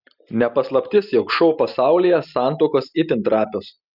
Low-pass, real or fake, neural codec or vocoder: 5.4 kHz; real; none